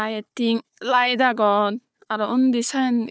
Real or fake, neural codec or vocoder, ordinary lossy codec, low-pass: fake; codec, 16 kHz, 4 kbps, FunCodec, trained on Chinese and English, 50 frames a second; none; none